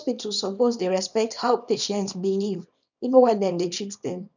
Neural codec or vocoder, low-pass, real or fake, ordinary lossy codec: codec, 24 kHz, 0.9 kbps, WavTokenizer, small release; 7.2 kHz; fake; none